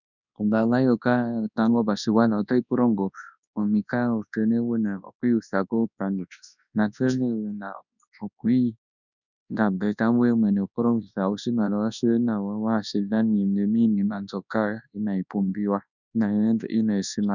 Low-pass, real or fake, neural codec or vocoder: 7.2 kHz; fake; codec, 24 kHz, 0.9 kbps, WavTokenizer, large speech release